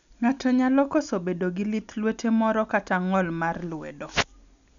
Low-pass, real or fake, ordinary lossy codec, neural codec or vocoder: 7.2 kHz; real; none; none